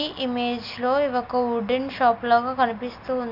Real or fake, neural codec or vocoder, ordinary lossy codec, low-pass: real; none; MP3, 48 kbps; 5.4 kHz